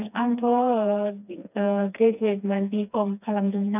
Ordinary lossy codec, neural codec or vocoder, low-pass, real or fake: none; codec, 16 kHz, 2 kbps, FreqCodec, smaller model; 3.6 kHz; fake